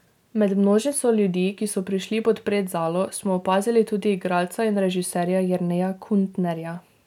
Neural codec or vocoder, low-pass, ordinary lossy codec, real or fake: none; 19.8 kHz; none; real